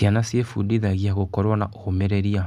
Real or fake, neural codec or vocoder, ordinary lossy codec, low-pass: real; none; none; none